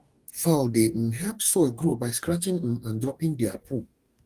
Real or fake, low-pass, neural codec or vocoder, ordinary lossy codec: fake; 14.4 kHz; codec, 44.1 kHz, 2.6 kbps, DAC; Opus, 32 kbps